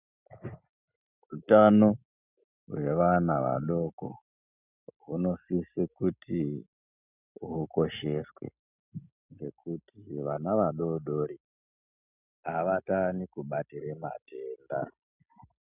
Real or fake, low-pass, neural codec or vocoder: real; 3.6 kHz; none